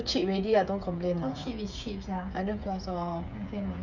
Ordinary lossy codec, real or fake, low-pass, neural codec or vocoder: none; fake; 7.2 kHz; codec, 16 kHz, 8 kbps, FreqCodec, smaller model